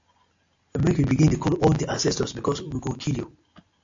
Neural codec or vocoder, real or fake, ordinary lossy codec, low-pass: none; real; AAC, 64 kbps; 7.2 kHz